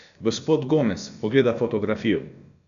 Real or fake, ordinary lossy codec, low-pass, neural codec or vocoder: fake; none; 7.2 kHz; codec, 16 kHz, about 1 kbps, DyCAST, with the encoder's durations